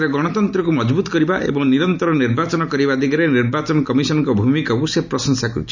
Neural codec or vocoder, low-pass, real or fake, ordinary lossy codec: none; 7.2 kHz; real; none